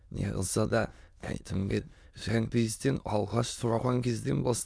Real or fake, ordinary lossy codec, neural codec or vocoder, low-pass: fake; none; autoencoder, 22.05 kHz, a latent of 192 numbers a frame, VITS, trained on many speakers; none